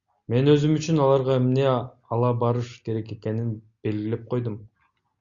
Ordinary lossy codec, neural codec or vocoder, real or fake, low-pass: Opus, 64 kbps; none; real; 7.2 kHz